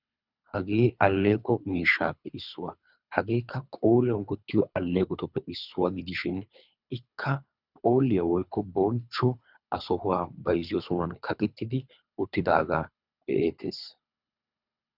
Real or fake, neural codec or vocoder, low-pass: fake; codec, 24 kHz, 3 kbps, HILCodec; 5.4 kHz